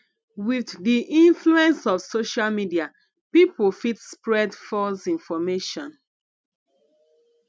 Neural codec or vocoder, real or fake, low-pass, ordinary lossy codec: none; real; none; none